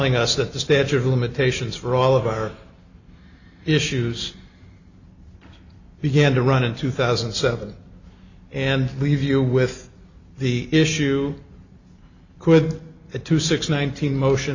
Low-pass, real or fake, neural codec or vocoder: 7.2 kHz; real; none